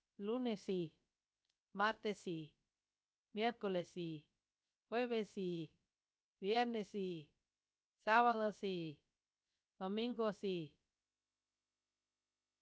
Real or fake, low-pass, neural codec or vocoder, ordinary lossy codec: fake; none; codec, 16 kHz, 0.7 kbps, FocalCodec; none